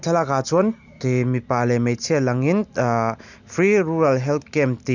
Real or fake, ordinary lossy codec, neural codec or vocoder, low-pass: real; none; none; 7.2 kHz